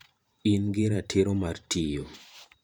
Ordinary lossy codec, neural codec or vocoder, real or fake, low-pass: none; vocoder, 44.1 kHz, 128 mel bands every 512 samples, BigVGAN v2; fake; none